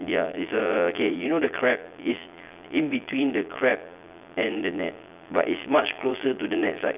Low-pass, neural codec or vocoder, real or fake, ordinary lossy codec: 3.6 kHz; vocoder, 22.05 kHz, 80 mel bands, Vocos; fake; none